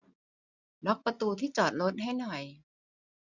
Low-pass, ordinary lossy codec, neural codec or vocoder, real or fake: 7.2 kHz; none; none; real